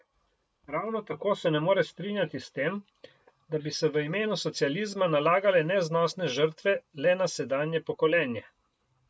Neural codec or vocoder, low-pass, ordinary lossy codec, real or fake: none; none; none; real